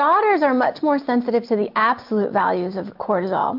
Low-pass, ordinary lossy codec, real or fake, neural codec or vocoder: 5.4 kHz; MP3, 48 kbps; real; none